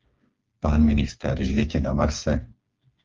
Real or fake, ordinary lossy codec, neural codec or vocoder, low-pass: fake; Opus, 32 kbps; codec, 16 kHz, 4 kbps, FreqCodec, smaller model; 7.2 kHz